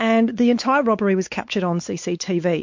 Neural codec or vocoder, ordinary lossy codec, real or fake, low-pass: codec, 16 kHz, 4 kbps, X-Codec, WavLM features, trained on Multilingual LibriSpeech; MP3, 48 kbps; fake; 7.2 kHz